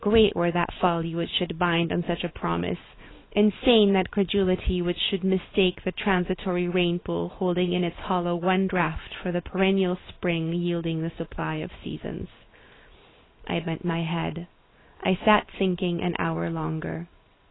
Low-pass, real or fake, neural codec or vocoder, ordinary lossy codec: 7.2 kHz; real; none; AAC, 16 kbps